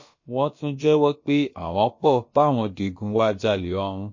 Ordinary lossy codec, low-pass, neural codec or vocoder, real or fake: MP3, 32 kbps; 7.2 kHz; codec, 16 kHz, about 1 kbps, DyCAST, with the encoder's durations; fake